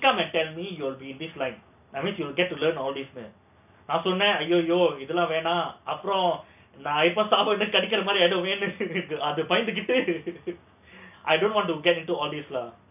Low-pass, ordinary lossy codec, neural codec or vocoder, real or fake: 3.6 kHz; none; none; real